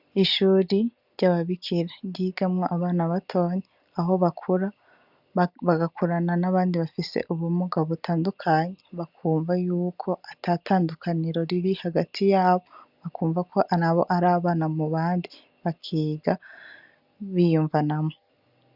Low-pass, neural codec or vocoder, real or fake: 5.4 kHz; none; real